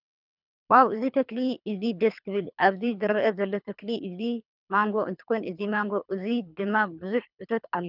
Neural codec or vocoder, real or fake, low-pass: codec, 24 kHz, 3 kbps, HILCodec; fake; 5.4 kHz